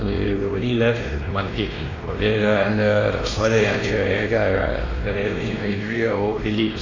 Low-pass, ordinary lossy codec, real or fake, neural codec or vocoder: 7.2 kHz; AAC, 32 kbps; fake; codec, 16 kHz, 1 kbps, X-Codec, WavLM features, trained on Multilingual LibriSpeech